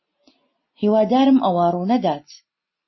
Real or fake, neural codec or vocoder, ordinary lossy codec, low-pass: real; none; MP3, 24 kbps; 7.2 kHz